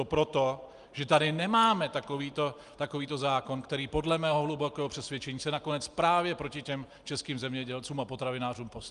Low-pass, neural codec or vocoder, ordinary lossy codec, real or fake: 10.8 kHz; none; Opus, 32 kbps; real